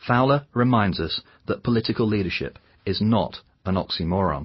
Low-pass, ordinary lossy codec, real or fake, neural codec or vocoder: 7.2 kHz; MP3, 24 kbps; real; none